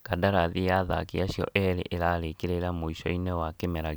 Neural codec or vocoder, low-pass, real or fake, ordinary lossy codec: none; none; real; none